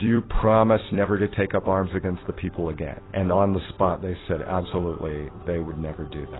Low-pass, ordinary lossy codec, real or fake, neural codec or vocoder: 7.2 kHz; AAC, 16 kbps; fake; vocoder, 44.1 kHz, 128 mel bands every 256 samples, BigVGAN v2